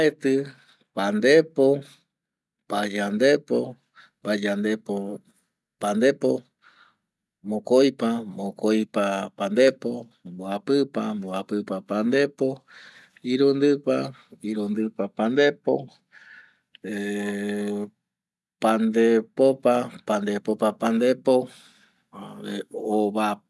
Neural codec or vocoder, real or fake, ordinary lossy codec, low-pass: none; real; none; none